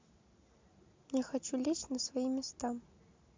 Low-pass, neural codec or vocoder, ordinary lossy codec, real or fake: 7.2 kHz; none; MP3, 64 kbps; real